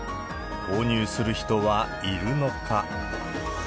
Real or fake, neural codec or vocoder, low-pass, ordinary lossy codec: real; none; none; none